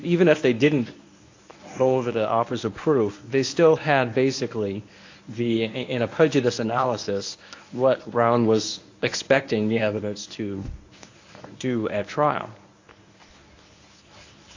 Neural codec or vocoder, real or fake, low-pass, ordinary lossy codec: codec, 24 kHz, 0.9 kbps, WavTokenizer, medium speech release version 1; fake; 7.2 kHz; AAC, 48 kbps